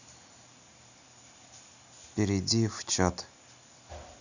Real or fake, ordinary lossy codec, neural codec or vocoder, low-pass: real; none; none; 7.2 kHz